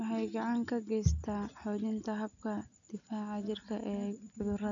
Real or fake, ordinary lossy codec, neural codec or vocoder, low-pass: real; none; none; 7.2 kHz